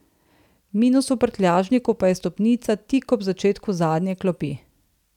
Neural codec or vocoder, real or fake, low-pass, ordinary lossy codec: none; real; 19.8 kHz; none